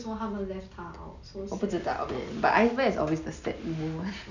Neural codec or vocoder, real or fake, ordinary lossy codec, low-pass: none; real; none; 7.2 kHz